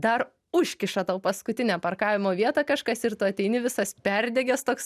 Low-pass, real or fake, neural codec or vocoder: 14.4 kHz; real; none